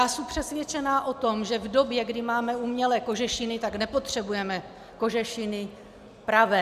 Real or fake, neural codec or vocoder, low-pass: real; none; 14.4 kHz